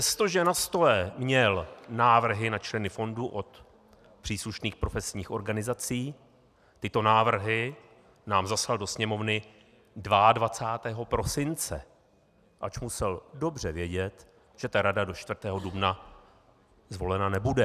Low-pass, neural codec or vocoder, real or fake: 14.4 kHz; none; real